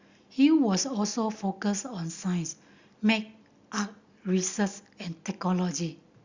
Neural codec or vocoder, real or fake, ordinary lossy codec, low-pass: none; real; Opus, 64 kbps; 7.2 kHz